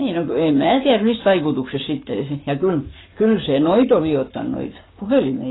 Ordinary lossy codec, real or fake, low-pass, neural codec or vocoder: AAC, 16 kbps; fake; 7.2 kHz; codec, 44.1 kHz, 7.8 kbps, Pupu-Codec